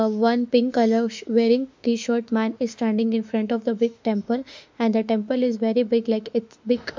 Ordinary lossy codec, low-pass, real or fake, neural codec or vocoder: MP3, 64 kbps; 7.2 kHz; fake; autoencoder, 48 kHz, 32 numbers a frame, DAC-VAE, trained on Japanese speech